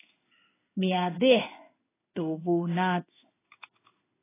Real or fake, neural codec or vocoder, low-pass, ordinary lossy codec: fake; codec, 16 kHz in and 24 kHz out, 1 kbps, XY-Tokenizer; 3.6 kHz; AAC, 16 kbps